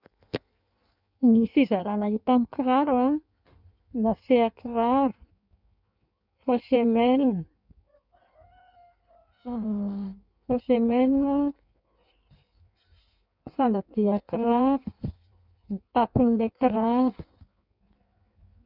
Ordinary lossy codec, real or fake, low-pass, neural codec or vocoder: none; fake; 5.4 kHz; codec, 16 kHz in and 24 kHz out, 1.1 kbps, FireRedTTS-2 codec